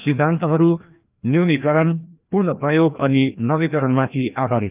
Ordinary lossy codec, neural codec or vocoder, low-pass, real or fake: Opus, 24 kbps; codec, 16 kHz, 1 kbps, FreqCodec, larger model; 3.6 kHz; fake